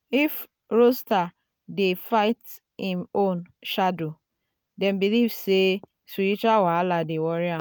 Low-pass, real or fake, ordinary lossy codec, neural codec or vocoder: none; real; none; none